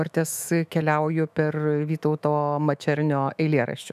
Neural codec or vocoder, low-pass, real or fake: autoencoder, 48 kHz, 128 numbers a frame, DAC-VAE, trained on Japanese speech; 14.4 kHz; fake